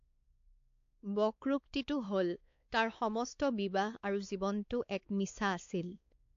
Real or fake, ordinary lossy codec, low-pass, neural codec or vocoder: fake; AAC, 48 kbps; 7.2 kHz; codec, 16 kHz, 4 kbps, X-Codec, WavLM features, trained on Multilingual LibriSpeech